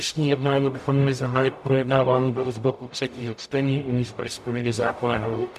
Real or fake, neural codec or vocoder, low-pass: fake; codec, 44.1 kHz, 0.9 kbps, DAC; 14.4 kHz